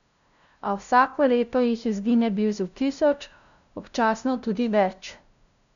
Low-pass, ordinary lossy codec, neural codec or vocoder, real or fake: 7.2 kHz; none; codec, 16 kHz, 0.5 kbps, FunCodec, trained on LibriTTS, 25 frames a second; fake